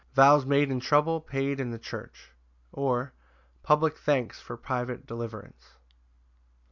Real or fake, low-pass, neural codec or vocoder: real; 7.2 kHz; none